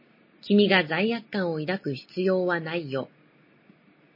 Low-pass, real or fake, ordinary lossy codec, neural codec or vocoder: 5.4 kHz; real; MP3, 24 kbps; none